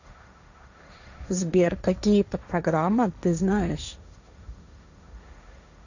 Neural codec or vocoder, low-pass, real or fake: codec, 16 kHz, 1.1 kbps, Voila-Tokenizer; 7.2 kHz; fake